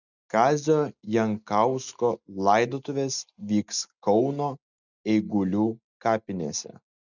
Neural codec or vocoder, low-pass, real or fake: none; 7.2 kHz; real